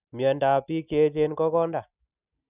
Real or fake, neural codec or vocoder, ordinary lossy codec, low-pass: real; none; none; 3.6 kHz